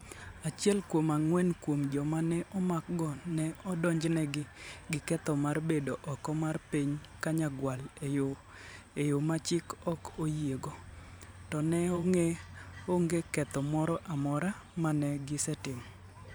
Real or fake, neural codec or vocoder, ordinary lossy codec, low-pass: fake; vocoder, 44.1 kHz, 128 mel bands every 512 samples, BigVGAN v2; none; none